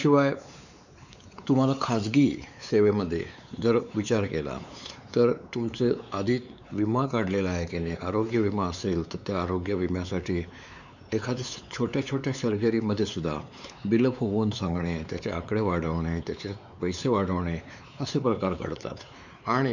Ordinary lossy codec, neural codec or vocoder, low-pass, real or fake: none; codec, 16 kHz, 4 kbps, X-Codec, WavLM features, trained on Multilingual LibriSpeech; 7.2 kHz; fake